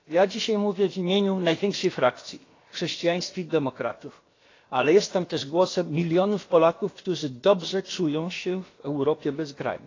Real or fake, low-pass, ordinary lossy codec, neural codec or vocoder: fake; 7.2 kHz; AAC, 32 kbps; codec, 16 kHz, about 1 kbps, DyCAST, with the encoder's durations